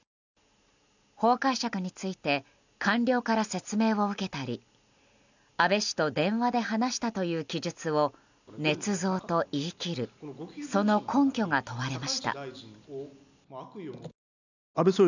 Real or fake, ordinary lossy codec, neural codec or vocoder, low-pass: real; none; none; 7.2 kHz